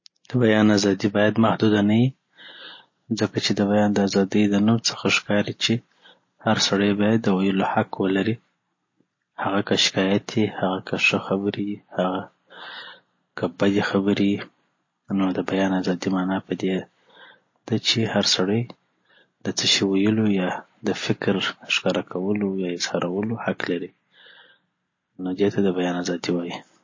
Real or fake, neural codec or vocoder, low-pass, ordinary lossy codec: real; none; 7.2 kHz; MP3, 32 kbps